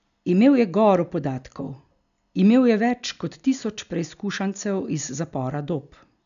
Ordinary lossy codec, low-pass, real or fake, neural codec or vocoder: none; 7.2 kHz; real; none